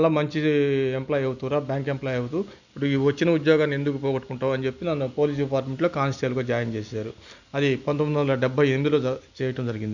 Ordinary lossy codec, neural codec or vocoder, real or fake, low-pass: none; none; real; 7.2 kHz